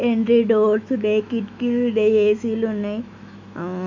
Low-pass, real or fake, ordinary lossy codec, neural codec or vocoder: 7.2 kHz; fake; MP3, 64 kbps; autoencoder, 48 kHz, 128 numbers a frame, DAC-VAE, trained on Japanese speech